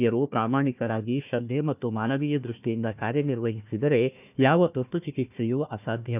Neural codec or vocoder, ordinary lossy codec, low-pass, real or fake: codec, 16 kHz, 1 kbps, FunCodec, trained on Chinese and English, 50 frames a second; none; 3.6 kHz; fake